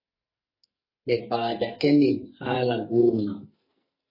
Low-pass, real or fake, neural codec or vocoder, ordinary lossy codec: 5.4 kHz; fake; codec, 44.1 kHz, 2.6 kbps, SNAC; MP3, 24 kbps